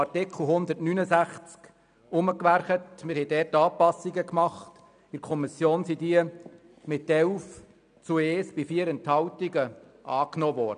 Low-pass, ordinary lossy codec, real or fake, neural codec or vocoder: 9.9 kHz; none; real; none